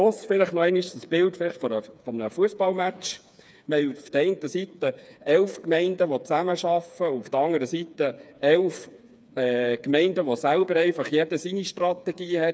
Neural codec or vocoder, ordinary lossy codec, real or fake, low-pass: codec, 16 kHz, 4 kbps, FreqCodec, smaller model; none; fake; none